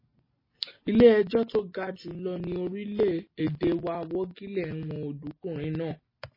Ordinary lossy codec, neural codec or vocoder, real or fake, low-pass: MP3, 24 kbps; none; real; 5.4 kHz